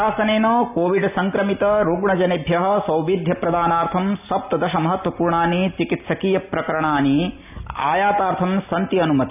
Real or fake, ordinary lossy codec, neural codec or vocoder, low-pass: real; none; none; 3.6 kHz